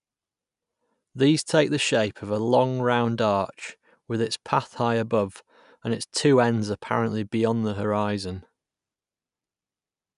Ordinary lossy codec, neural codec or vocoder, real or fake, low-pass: none; none; real; 10.8 kHz